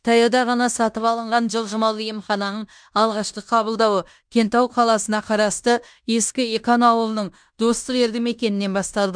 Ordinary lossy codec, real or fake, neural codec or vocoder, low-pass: MP3, 96 kbps; fake; codec, 16 kHz in and 24 kHz out, 0.9 kbps, LongCat-Audio-Codec, fine tuned four codebook decoder; 9.9 kHz